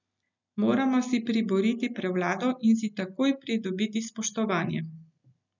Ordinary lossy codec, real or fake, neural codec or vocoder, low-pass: none; real; none; 7.2 kHz